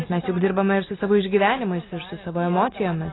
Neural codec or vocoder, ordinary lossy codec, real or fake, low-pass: none; AAC, 16 kbps; real; 7.2 kHz